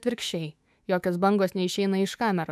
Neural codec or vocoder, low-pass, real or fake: autoencoder, 48 kHz, 128 numbers a frame, DAC-VAE, trained on Japanese speech; 14.4 kHz; fake